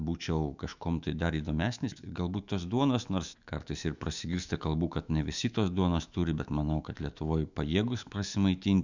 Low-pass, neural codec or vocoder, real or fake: 7.2 kHz; autoencoder, 48 kHz, 128 numbers a frame, DAC-VAE, trained on Japanese speech; fake